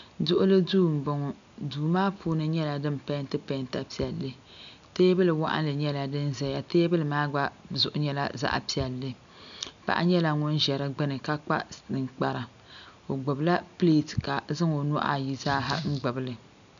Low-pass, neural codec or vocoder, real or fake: 7.2 kHz; none; real